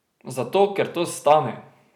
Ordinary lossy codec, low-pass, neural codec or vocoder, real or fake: none; 19.8 kHz; vocoder, 44.1 kHz, 128 mel bands every 256 samples, BigVGAN v2; fake